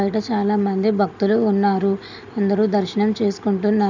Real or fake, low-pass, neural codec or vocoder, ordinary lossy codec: real; 7.2 kHz; none; none